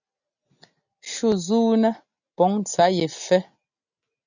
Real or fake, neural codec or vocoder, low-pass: real; none; 7.2 kHz